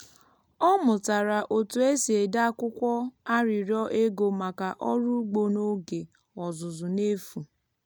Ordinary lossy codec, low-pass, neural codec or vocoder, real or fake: none; none; none; real